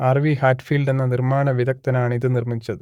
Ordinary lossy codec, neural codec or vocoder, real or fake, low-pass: none; codec, 44.1 kHz, 7.8 kbps, Pupu-Codec; fake; 19.8 kHz